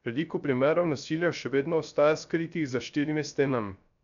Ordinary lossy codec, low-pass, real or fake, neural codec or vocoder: none; 7.2 kHz; fake; codec, 16 kHz, 0.3 kbps, FocalCodec